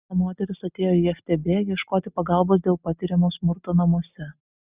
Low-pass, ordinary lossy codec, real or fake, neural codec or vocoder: 3.6 kHz; Opus, 64 kbps; real; none